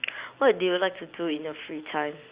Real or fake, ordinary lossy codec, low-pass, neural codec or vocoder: real; Opus, 64 kbps; 3.6 kHz; none